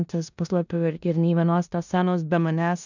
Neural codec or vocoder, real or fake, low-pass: codec, 16 kHz in and 24 kHz out, 0.9 kbps, LongCat-Audio-Codec, four codebook decoder; fake; 7.2 kHz